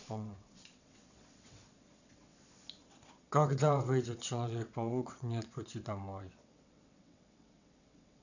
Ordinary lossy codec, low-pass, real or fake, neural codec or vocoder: none; 7.2 kHz; fake; vocoder, 22.05 kHz, 80 mel bands, WaveNeXt